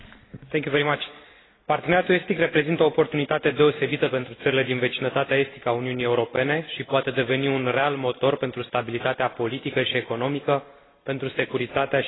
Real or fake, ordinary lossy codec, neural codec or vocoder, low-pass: real; AAC, 16 kbps; none; 7.2 kHz